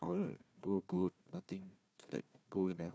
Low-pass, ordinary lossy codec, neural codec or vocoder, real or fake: none; none; codec, 16 kHz, 1 kbps, FunCodec, trained on Chinese and English, 50 frames a second; fake